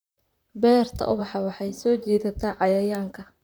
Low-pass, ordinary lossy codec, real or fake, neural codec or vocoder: none; none; fake; vocoder, 44.1 kHz, 128 mel bands, Pupu-Vocoder